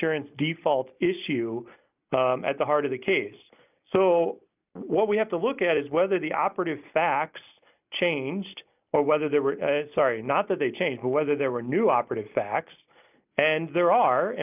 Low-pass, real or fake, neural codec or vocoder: 3.6 kHz; real; none